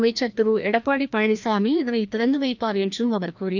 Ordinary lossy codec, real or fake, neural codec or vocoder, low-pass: none; fake; codec, 16 kHz, 1 kbps, FreqCodec, larger model; 7.2 kHz